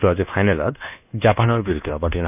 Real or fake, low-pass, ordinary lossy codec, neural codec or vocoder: fake; 3.6 kHz; none; codec, 24 kHz, 1.2 kbps, DualCodec